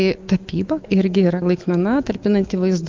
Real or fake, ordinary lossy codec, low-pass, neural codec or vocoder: fake; Opus, 32 kbps; 7.2 kHz; autoencoder, 48 kHz, 128 numbers a frame, DAC-VAE, trained on Japanese speech